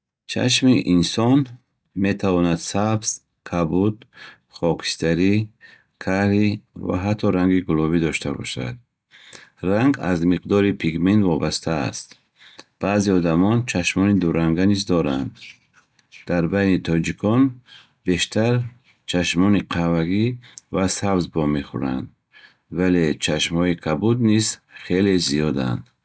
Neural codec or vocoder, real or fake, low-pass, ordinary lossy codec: none; real; none; none